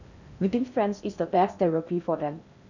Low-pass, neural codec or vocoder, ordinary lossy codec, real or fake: 7.2 kHz; codec, 16 kHz in and 24 kHz out, 0.6 kbps, FocalCodec, streaming, 4096 codes; none; fake